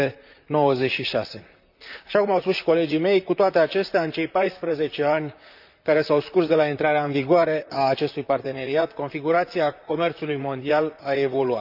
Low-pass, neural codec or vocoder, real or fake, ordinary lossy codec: 5.4 kHz; vocoder, 44.1 kHz, 128 mel bands, Pupu-Vocoder; fake; none